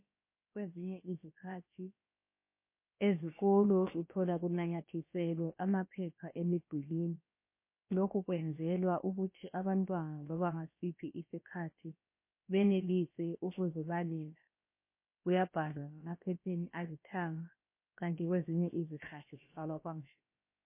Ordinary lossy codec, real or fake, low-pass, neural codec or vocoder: MP3, 24 kbps; fake; 3.6 kHz; codec, 16 kHz, about 1 kbps, DyCAST, with the encoder's durations